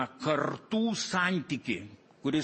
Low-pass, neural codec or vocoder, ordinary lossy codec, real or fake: 10.8 kHz; none; MP3, 32 kbps; real